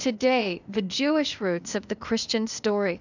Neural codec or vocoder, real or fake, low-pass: codec, 16 kHz, 0.8 kbps, ZipCodec; fake; 7.2 kHz